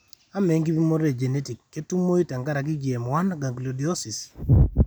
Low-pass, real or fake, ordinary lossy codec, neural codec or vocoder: none; real; none; none